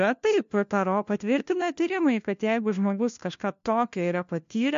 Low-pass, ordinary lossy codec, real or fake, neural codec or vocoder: 7.2 kHz; MP3, 48 kbps; fake; codec, 16 kHz, 1 kbps, FunCodec, trained on LibriTTS, 50 frames a second